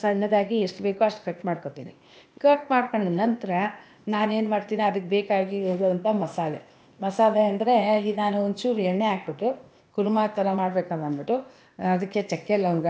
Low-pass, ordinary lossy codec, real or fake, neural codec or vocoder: none; none; fake; codec, 16 kHz, 0.8 kbps, ZipCodec